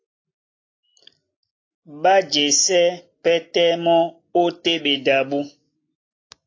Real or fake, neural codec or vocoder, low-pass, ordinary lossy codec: real; none; 7.2 kHz; AAC, 32 kbps